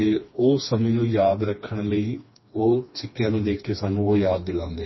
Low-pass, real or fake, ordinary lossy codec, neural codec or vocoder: 7.2 kHz; fake; MP3, 24 kbps; codec, 16 kHz, 2 kbps, FreqCodec, smaller model